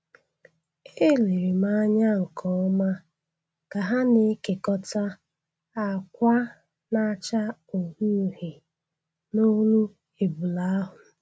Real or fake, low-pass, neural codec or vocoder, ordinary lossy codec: real; none; none; none